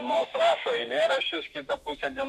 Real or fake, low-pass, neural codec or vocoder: fake; 14.4 kHz; autoencoder, 48 kHz, 32 numbers a frame, DAC-VAE, trained on Japanese speech